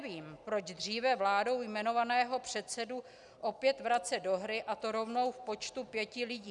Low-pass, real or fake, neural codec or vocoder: 10.8 kHz; real; none